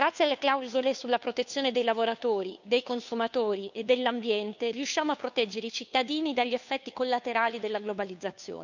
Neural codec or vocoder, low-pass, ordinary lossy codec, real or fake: codec, 16 kHz, 2 kbps, FunCodec, trained on Chinese and English, 25 frames a second; 7.2 kHz; none; fake